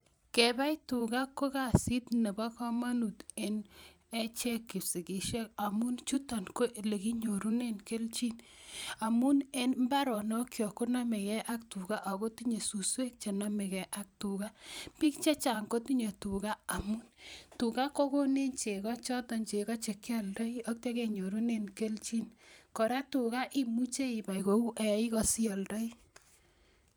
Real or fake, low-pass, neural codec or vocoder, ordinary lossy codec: fake; none; vocoder, 44.1 kHz, 128 mel bands every 512 samples, BigVGAN v2; none